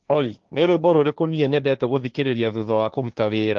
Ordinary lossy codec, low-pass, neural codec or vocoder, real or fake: Opus, 32 kbps; 7.2 kHz; codec, 16 kHz, 1.1 kbps, Voila-Tokenizer; fake